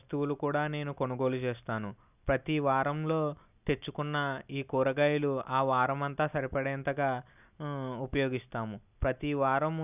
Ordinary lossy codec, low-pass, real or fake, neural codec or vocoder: none; 3.6 kHz; real; none